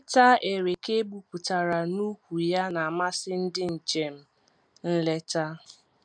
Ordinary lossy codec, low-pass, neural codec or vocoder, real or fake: none; 9.9 kHz; none; real